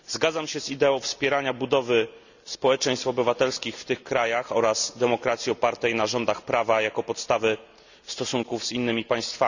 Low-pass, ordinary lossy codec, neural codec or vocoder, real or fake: 7.2 kHz; none; none; real